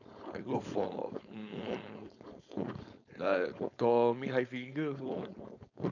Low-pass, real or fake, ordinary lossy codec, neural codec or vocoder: 7.2 kHz; fake; none; codec, 16 kHz, 4.8 kbps, FACodec